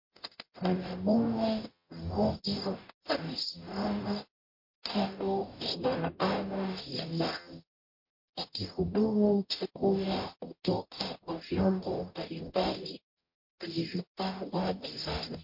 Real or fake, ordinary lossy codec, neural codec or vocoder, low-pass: fake; MP3, 32 kbps; codec, 44.1 kHz, 0.9 kbps, DAC; 5.4 kHz